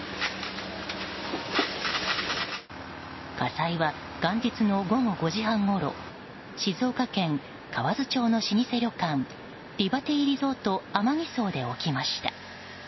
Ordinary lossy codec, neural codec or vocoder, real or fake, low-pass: MP3, 24 kbps; none; real; 7.2 kHz